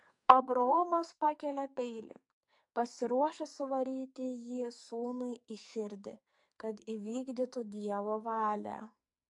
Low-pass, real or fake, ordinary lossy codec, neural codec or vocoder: 10.8 kHz; fake; MP3, 64 kbps; codec, 44.1 kHz, 2.6 kbps, SNAC